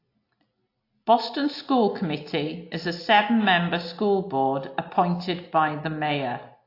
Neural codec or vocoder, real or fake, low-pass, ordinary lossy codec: none; real; 5.4 kHz; AAC, 32 kbps